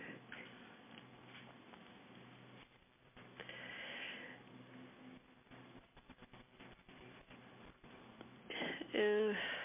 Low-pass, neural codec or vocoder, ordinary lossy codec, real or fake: 3.6 kHz; none; MP3, 32 kbps; real